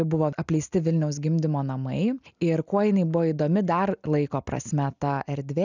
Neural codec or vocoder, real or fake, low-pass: none; real; 7.2 kHz